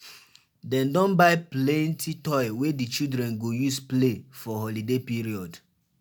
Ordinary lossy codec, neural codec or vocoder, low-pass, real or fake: none; none; none; real